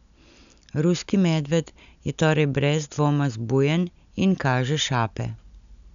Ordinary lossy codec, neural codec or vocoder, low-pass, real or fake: none; none; 7.2 kHz; real